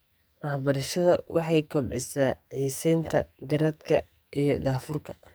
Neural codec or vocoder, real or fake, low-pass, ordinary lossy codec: codec, 44.1 kHz, 2.6 kbps, SNAC; fake; none; none